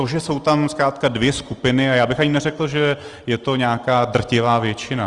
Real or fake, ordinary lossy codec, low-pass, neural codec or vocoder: real; Opus, 24 kbps; 10.8 kHz; none